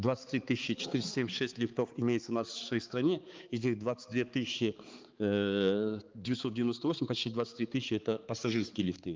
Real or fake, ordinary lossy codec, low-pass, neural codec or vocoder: fake; Opus, 32 kbps; 7.2 kHz; codec, 16 kHz, 4 kbps, X-Codec, HuBERT features, trained on balanced general audio